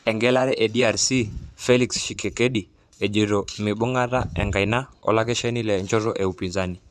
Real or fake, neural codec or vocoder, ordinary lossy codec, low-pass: fake; vocoder, 24 kHz, 100 mel bands, Vocos; none; none